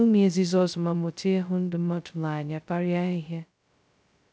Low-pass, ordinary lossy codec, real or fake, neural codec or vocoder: none; none; fake; codec, 16 kHz, 0.2 kbps, FocalCodec